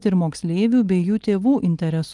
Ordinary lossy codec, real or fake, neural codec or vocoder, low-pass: Opus, 24 kbps; real; none; 10.8 kHz